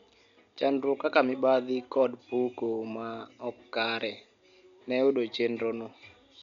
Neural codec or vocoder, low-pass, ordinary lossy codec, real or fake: none; 7.2 kHz; none; real